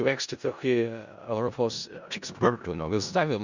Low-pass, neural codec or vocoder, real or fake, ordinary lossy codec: 7.2 kHz; codec, 16 kHz in and 24 kHz out, 0.4 kbps, LongCat-Audio-Codec, four codebook decoder; fake; Opus, 64 kbps